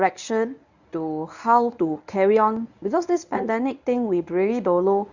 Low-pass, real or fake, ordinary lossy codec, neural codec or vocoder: 7.2 kHz; fake; none; codec, 24 kHz, 0.9 kbps, WavTokenizer, medium speech release version 1